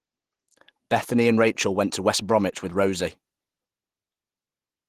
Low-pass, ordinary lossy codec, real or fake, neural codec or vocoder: 14.4 kHz; Opus, 16 kbps; real; none